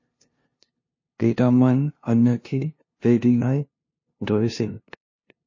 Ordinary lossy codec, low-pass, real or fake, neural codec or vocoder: MP3, 32 kbps; 7.2 kHz; fake; codec, 16 kHz, 0.5 kbps, FunCodec, trained on LibriTTS, 25 frames a second